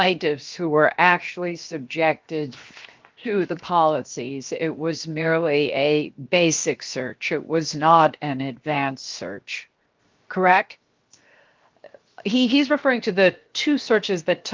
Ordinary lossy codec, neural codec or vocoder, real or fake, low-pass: Opus, 24 kbps; codec, 16 kHz, 0.7 kbps, FocalCodec; fake; 7.2 kHz